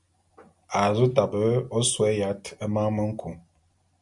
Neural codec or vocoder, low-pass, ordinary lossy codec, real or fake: none; 10.8 kHz; MP3, 96 kbps; real